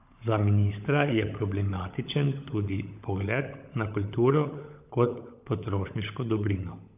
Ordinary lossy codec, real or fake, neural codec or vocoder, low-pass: AAC, 32 kbps; fake; codec, 16 kHz, 16 kbps, FunCodec, trained on LibriTTS, 50 frames a second; 3.6 kHz